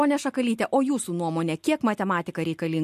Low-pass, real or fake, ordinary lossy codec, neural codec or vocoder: 14.4 kHz; real; MP3, 64 kbps; none